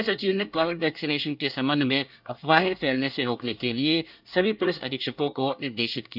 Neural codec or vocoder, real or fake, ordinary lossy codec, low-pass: codec, 24 kHz, 1 kbps, SNAC; fake; none; 5.4 kHz